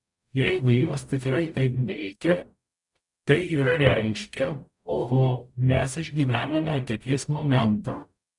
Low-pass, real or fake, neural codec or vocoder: 10.8 kHz; fake; codec, 44.1 kHz, 0.9 kbps, DAC